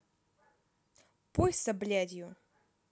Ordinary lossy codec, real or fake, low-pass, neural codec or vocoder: none; real; none; none